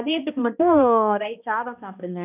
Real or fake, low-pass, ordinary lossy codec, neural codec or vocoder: fake; 3.6 kHz; none; codec, 16 kHz, 1 kbps, X-Codec, HuBERT features, trained on balanced general audio